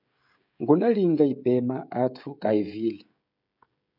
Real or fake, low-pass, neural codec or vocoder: fake; 5.4 kHz; codec, 16 kHz, 16 kbps, FreqCodec, smaller model